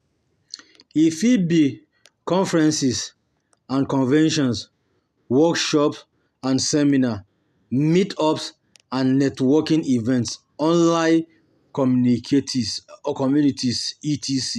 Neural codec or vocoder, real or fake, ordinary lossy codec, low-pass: none; real; none; 14.4 kHz